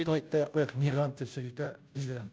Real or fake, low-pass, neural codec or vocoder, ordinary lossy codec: fake; none; codec, 16 kHz, 0.5 kbps, FunCodec, trained on Chinese and English, 25 frames a second; none